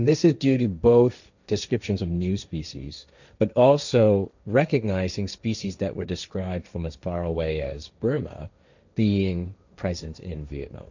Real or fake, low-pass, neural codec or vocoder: fake; 7.2 kHz; codec, 16 kHz, 1.1 kbps, Voila-Tokenizer